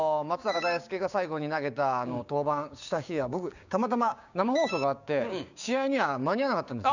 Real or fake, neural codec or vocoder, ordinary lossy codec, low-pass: fake; codec, 16 kHz, 6 kbps, DAC; none; 7.2 kHz